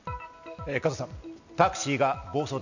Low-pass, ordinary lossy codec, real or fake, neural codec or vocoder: 7.2 kHz; none; real; none